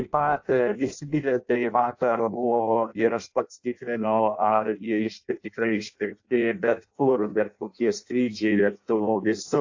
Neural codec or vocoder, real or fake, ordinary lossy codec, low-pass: codec, 16 kHz in and 24 kHz out, 0.6 kbps, FireRedTTS-2 codec; fake; AAC, 48 kbps; 7.2 kHz